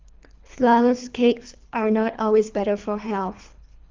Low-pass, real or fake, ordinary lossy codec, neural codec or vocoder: 7.2 kHz; fake; Opus, 24 kbps; codec, 24 kHz, 3 kbps, HILCodec